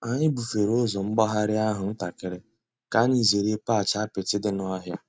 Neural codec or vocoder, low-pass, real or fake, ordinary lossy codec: none; none; real; none